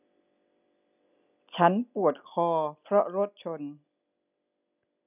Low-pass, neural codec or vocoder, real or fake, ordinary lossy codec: 3.6 kHz; none; real; none